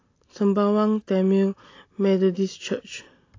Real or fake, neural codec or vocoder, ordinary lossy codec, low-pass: real; none; AAC, 32 kbps; 7.2 kHz